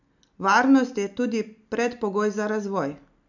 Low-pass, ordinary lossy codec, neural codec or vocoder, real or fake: 7.2 kHz; none; none; real